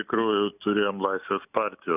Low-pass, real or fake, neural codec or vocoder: 3.6 kHz; real; none